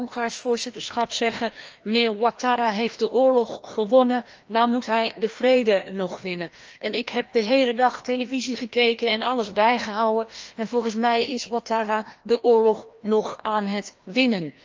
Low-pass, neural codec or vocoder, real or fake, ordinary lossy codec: 7.2 kHz; codec, 16 kHz, 1 kbps, FreqCodec, larger model; fake; Opus, 24 kbps